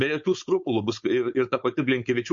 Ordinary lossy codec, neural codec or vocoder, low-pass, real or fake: MP3, 48 kbps; codec, 16 kHz, 4.8 kbps, FACodec; 7.2 kHz; fake